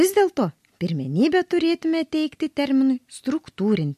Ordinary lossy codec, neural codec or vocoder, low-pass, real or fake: MP3, 64 kbps; none; 14.4 kHz; real